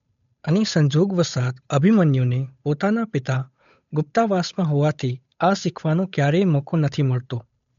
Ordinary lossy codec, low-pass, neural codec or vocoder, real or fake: MP3, 48 kbps; 7.2 kHz; codec, 16 kHz, 8 kbps, FunCodec, trained on Chinese and English, 25 frames a second; fake